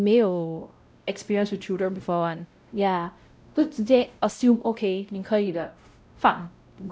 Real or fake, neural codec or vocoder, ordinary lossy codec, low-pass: fake; codec, 16 kHz, 0.5 kbps, X-Codec, WavLM features, trained on Multilingual LibriSpeech; none; none